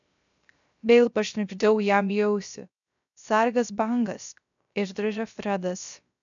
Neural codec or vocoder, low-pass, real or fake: codec, 16 kHz, 0.3 kbps, FocalCodec; 7.2 kHz; fake